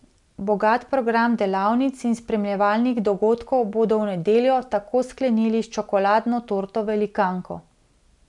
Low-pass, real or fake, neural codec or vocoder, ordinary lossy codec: 10.8 kHz; real; none; none